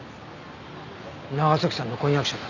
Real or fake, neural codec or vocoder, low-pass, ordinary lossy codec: real; none; 7.2 kHz; none